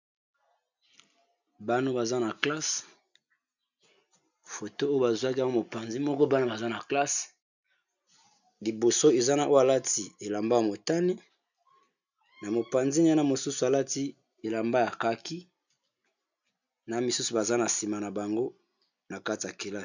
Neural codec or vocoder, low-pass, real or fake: none; 7.2 kHz; real